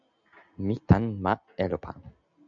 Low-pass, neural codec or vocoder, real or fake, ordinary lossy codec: 7.2 kHz; none; real; MP3, 96 kbps